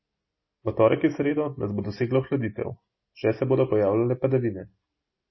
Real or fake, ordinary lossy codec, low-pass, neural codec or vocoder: real; MP3, 24 kbps; 7.2 kHz; none